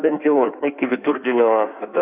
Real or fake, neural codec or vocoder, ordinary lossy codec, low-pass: fake; codec, 16 kHz in and 24 kHz out, 1.1 kbps, FireRedTTS-2 codec; AAC, 32 kbps; 3.6 kHz